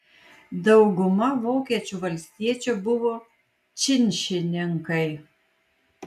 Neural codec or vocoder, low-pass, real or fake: none; 14.4 kHz; real